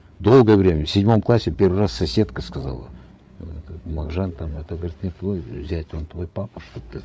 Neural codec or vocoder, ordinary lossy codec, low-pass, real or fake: codec, 16 kHz, 8 kbps, FreqCodec, larger model; none; none; fake